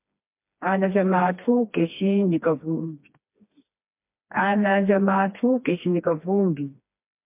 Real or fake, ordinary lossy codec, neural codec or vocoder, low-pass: fake; MP3, 32 kbps; codec, 16 kHz, 2 kbps, FreqCodec, smaller model; 3.6 kHz